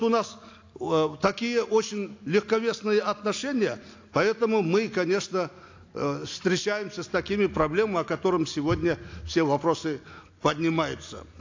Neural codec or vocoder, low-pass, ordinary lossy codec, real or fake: none; 7.2 kHz; AAC, 48 kbps; real